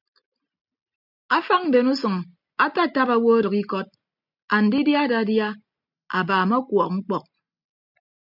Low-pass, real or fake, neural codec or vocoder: 5.4 kHz; real; none